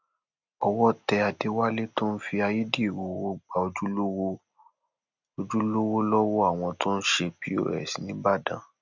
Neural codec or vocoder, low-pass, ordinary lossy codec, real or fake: none; 7.2 kHz; none; real